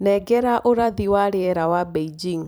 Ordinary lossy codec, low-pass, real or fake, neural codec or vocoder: none; none; fake; vocoder, 44.1 kHz, 128 mel bands every 256 samples, BigVGAN v2